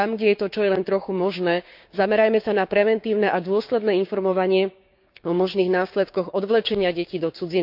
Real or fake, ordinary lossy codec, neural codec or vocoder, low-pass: fake; none; codec, 16 kHz, 6 kbps, DAC; 5.4 kHz